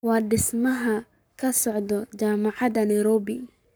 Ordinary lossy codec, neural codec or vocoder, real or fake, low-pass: none; codec, 44.1 kHz, 7.8 kbps, Pupu-Codec; fake; none